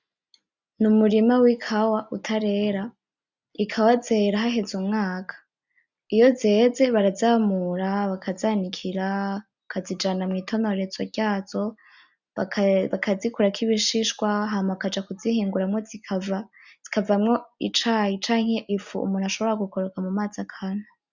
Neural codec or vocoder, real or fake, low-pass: none; real; 7.2 kHz